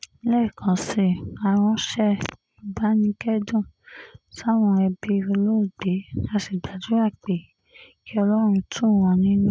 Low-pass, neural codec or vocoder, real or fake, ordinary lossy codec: none; none; real; none